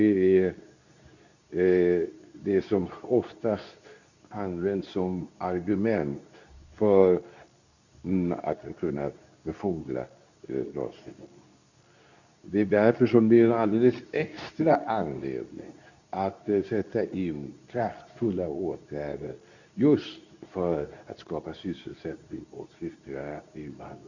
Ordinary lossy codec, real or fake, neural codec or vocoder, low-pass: none; fake; codec, 24 kHz, 0.9 kbps, WavTokenizer, medium speech release version 2; 7.2 kHz